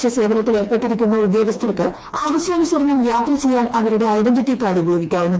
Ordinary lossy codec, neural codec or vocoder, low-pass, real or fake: none; codec, 16 kHz, 2 kbps, FreqCodec, smaller model; none; fake